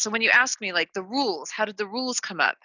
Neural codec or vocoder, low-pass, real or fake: none; 7.2 kHz; real